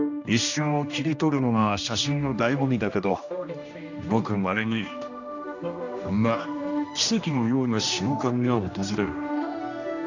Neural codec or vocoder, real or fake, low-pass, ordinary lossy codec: codec, 16 kHz, 1 kbps, X-Codec, HuBERT features, trained on general audio; fake; 7.2 kHz; none